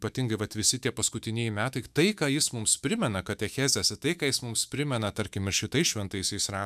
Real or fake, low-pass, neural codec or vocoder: fake; 14.4 kHz; autoencoder, 48 kHz, 128 numbers a frame, DAC-VAE, trained on Japanese speech